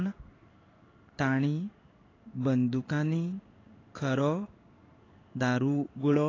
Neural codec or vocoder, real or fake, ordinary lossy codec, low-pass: codec, 16 kHz in and 24 kHz out, 1 kbps, XY-Tokenizer; fake; AAC, 32 kbps; 7.2 kHz